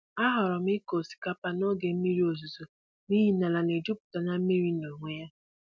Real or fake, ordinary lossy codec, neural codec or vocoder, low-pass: real; none; none; none